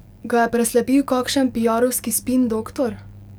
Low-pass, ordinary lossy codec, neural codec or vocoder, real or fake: none; none; codec, 44.1 kHz, 7.8 kbps, DAC; fake